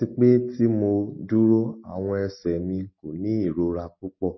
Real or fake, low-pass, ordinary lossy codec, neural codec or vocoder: real; 7.2 kHz; MP3, 24 kbps; none